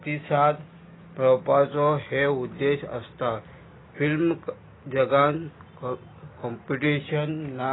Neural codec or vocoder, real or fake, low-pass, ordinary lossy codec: none; real; 7.2 kHz; AAC, 16 kbps